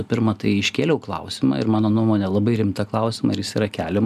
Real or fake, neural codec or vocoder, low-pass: real; none; 14.4 kHz